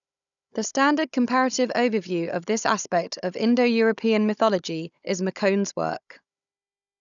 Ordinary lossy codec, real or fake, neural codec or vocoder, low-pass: none; fake; codec, 16 kHz, 16 kbps, FunCodec, trained on Chinese and English, 50 frames a second; 7.2 kHz